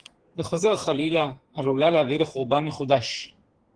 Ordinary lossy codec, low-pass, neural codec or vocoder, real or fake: Opus, 16 kbps; 9.9 kHz; codec, 32 kHz, 1.9 kbps, SNAC; fake